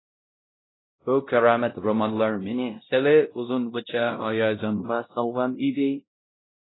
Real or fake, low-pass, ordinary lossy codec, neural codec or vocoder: fake; 7.2 kHz; AAC, 16 kbps; codec, 16 kHz, 0.5 kbps, X-Codec, WavLM features, trained on Multilingual LibriSpeech